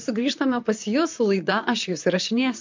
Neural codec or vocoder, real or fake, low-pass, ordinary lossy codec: vocoder, 44.1 kHz, 128 mel bands, Pupu-Vocoder; fake; 7.2 kHz; MP3, 64 kbps